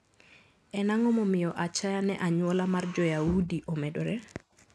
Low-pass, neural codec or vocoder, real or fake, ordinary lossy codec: none; none; real; none